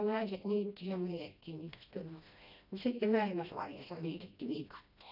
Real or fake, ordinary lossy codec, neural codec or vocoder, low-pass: fake; none; codec, 16 kHz, 1 kbps, FreqCodec, smaller model; 5.4 kHz